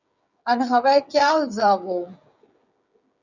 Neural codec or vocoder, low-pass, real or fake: codec, 16 kHz, 16 kbps, FreqCodec, smaller model; 7.2 kHz; fake